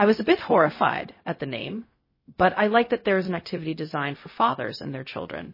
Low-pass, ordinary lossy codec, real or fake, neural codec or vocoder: 5.4 kHz; MP3, 24 kbps; fake; codec, 16 kHz, 0.4 kbps, LongCat-Audio-Codec